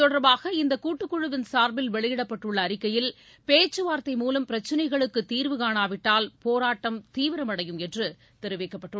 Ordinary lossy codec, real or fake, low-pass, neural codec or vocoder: none; real; none; none